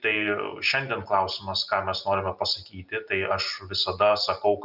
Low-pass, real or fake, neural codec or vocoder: 5.4 kHz; real; none